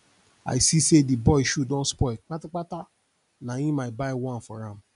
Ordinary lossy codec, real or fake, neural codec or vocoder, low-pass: none; real; none; 10.8 kHz